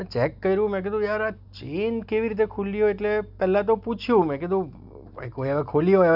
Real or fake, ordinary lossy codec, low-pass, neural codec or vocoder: real; none; 5.4 kHz; none